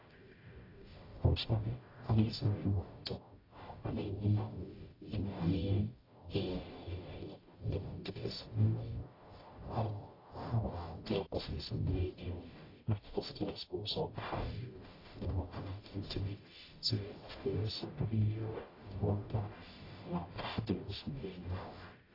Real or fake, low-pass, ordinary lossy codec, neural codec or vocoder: fake; 5.4 kHz; AAC, 24 kbps; codec, 44.1 kHz, 0.9 kbps, DAC